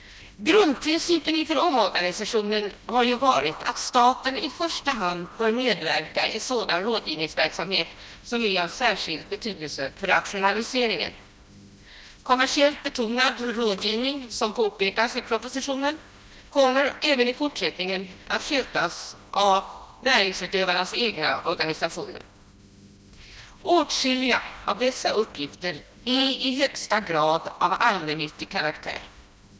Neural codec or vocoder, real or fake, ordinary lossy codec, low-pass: codec, 16 kHz, 1 kbps, FreqCodec, smaller model; fake; none; none